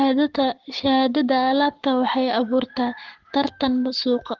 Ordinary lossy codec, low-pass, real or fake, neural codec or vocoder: Opus, 16 kbps; 7.2 kHz; fake; codec, 44.1 kHz, 7.8 kbps, Pupu-Codec